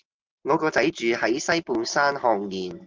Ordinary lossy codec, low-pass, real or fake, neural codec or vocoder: Opus, 24 kbps; 7.2 kHz; real; none